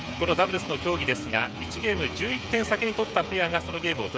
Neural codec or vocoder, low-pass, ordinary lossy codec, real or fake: codec, 16 kHz, 8 kbps, FreqCodec, smaller model; none; none; fake